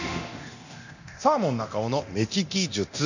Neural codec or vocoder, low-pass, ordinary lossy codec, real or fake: codec, 24 kHz, 0.9 kbps, DualCodec; 7.2 kHz; none; fake